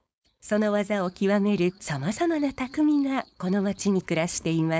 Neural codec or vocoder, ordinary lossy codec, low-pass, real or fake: codec, 16 kHz, 4.8 kbps, FACodec; none; none; fake